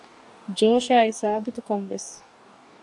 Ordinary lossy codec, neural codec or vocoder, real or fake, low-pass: AAC, 64 kbps; codec, 44.1 kHz, 2.6 kbps, DAC; fake; 10.8 kHz